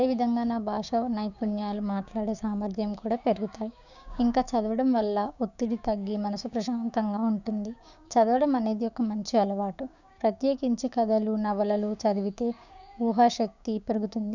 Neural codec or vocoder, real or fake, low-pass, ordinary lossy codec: codec, 16 kHz, 6 kbps, DAC; fake; 7.2 kHz; none